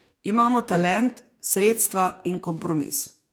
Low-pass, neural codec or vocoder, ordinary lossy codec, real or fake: none; codec, 44.1 kHz, 2.6 kbps, DAC; none; fake